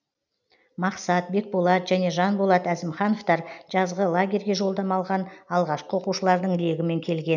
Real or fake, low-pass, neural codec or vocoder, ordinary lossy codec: real; 7.2 kHz; none; none